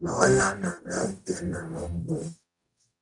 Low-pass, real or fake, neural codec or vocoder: 10.8 kHz; fake; codec, 44.1 kHz, 0.9 kbps, DAC